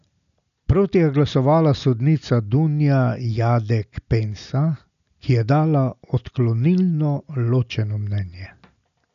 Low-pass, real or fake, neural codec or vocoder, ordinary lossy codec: 7.2 kHz; real; none; none